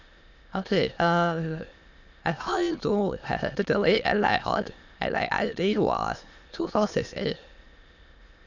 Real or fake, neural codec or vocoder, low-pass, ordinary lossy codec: fake; autoencoder, 22.05 kHz, a latent of 192 numbers a frame, VITS, trained on many speakers; 7.2 kHz; none